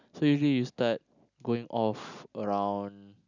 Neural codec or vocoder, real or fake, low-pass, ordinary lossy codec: none; real; 7.2 kHz; none